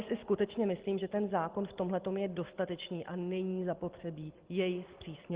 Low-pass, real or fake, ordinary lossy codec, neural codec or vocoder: 3.6 kHz; real; Opus, 16 kbps; none